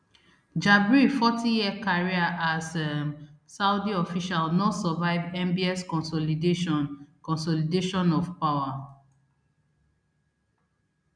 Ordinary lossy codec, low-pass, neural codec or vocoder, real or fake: none; 9.9 kHz; none; real